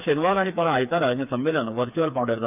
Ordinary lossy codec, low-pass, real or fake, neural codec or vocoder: none; 3.6 kHz; fake; codec, 16 kHz, 4 kbps, FreqCodec, smaller model